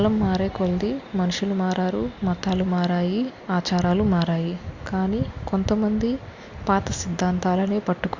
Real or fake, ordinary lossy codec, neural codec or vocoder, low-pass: real; Opus, 64 kbps; none; 7.2 kHz